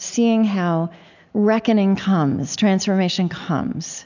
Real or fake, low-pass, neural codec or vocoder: real; 7.2 kHz; none